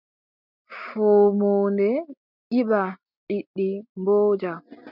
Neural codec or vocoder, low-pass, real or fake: none; 5.4 kHz; real